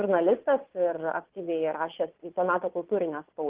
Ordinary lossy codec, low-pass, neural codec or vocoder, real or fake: Opus, 16 kbps; 3.6 kHz; vocoder, 22.05 kHz, 80 mel bands, Vocos; fake